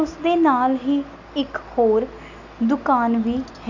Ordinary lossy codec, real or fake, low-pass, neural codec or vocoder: none; real; 7.2 kHz; none